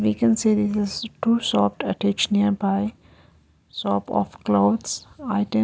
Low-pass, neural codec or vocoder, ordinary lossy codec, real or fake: none; none; none; real